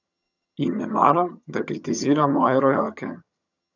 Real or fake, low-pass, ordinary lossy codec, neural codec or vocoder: fake; 7.2 kHz; none; vocoder, 22.05 kHz, 80 mel bands, HiFi-GAN